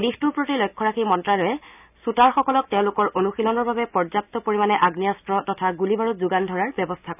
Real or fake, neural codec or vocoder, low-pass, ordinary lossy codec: real; none; 3.6 kHz; none